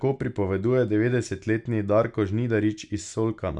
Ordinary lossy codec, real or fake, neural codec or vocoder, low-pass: none; real; none; 10.8 kHz